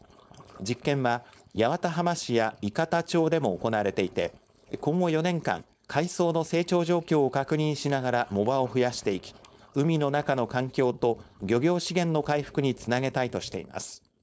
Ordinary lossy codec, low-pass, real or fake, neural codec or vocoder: none; none; fake; codec, 16 kHz, 4.8 kbps, FACodec